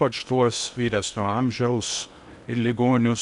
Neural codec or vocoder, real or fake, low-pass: codec, 16 kHz in and 24 kHz out, 0.8 kbps, FocalCodec, streaming, 65536 codes; fake; 10.8 kHz